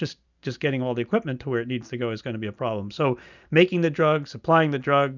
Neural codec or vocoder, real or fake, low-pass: none; real; 7.2 kHz